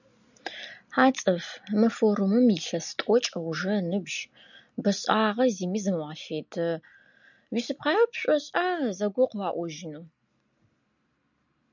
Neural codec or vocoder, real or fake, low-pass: none; real; 7.2 kHz